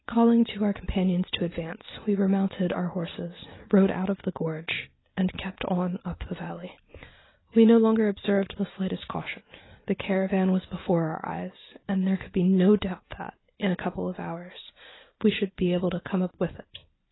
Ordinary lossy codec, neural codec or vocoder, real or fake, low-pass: AAC, 16 kbps; none; real; 7.2 kHz